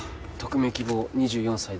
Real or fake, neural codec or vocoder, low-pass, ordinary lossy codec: real; none; none; none